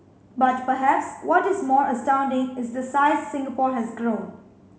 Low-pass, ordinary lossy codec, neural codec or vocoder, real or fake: none; none; none; real